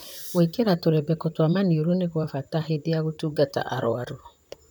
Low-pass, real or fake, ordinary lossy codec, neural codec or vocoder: none; fake; none; vocoder, 44.1 kHz, 128 mel bands, Pupu-Vocoder